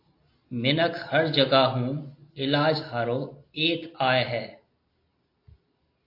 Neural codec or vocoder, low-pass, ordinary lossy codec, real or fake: none; 5.4 kHz; AAC, 32 kbps; real